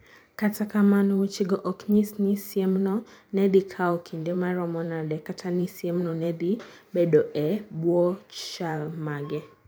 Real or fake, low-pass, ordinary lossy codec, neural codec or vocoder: fake; none; none; vocoder, 44.1 kHz, 128 mel bands every 512 samples, BigVGAN v2